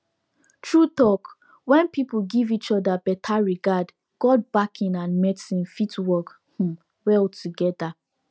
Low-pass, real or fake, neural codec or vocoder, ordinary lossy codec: none; real; none; none